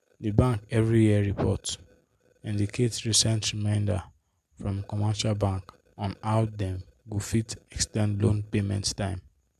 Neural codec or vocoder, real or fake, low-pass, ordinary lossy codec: none; real; 14.4 kHz; MP3, 96 kbps